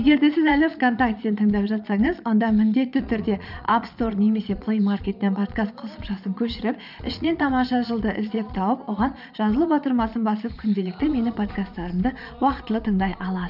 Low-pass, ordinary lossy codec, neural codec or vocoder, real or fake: 5.4 kHz; none; vocoder, 22.05 kHz, 80 mel bands, Vocos; fake